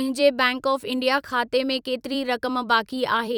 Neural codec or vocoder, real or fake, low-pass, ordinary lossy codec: vocoder, 44.1 kHz, 128 mel bands every 256 samples, BigVGAN v2; fake; 19.8 kHz; none